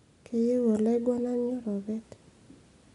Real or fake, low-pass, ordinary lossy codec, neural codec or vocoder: real; 10.8 kHz; none; none